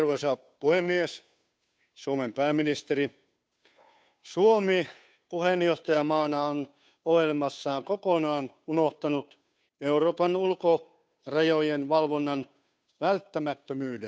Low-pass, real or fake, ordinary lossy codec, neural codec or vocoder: none; fake; none; codec, 16 kHz, 2 kbps, FunCodec, trained on Chinese and English, 25 frames a second